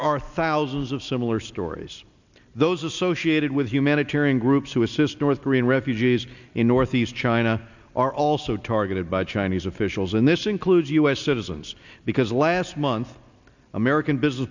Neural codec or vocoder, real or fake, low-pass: none; real; 7.2 kHz